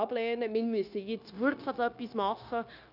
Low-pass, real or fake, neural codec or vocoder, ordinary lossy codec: 5.4 kHz; fake; codec, 16 kHz, 0.9 kbps, LongCat-Audio-Codec; none